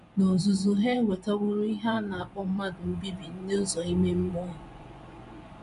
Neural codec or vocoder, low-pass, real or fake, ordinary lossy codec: vocoder, 24 kHz, 100 mel bands, Vocos; 10.8 kHz; fake; none